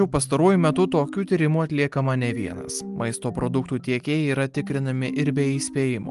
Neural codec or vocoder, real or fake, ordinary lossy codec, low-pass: none; real; Opus, 32 kbps; 10.8 kHz